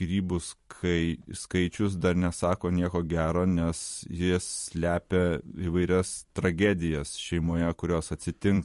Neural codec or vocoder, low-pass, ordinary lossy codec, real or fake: vocoder, 44.1 kHz, 128 mel bands every 256 samples, BigVGAN v2; 14.4 kHz; MP3, 48 kbps; fake